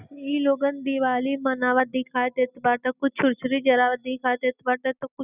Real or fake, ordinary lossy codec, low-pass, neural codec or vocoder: real; none; 3.6 kHz; none